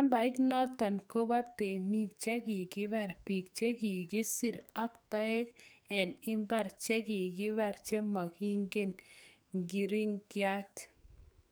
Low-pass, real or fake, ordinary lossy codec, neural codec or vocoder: none; fake; none; codec, 44.1 kHz, 2.6 kbps, SNAC